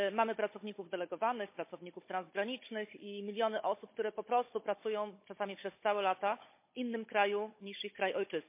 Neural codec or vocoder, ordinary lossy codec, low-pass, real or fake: codec, 16 kHz, 16 kbps, FunCodec, trained on Chinese and English, 50 frames a second; MP3, 24 kbps; 3.6 kHz; fake